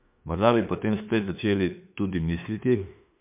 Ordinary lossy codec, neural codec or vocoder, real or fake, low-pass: MP3, 32 kbps; autoencoder, 48 kHz, 32 numbers a frame, DAC-VAE, trained on Japanese speech; fake; 3.6 kHz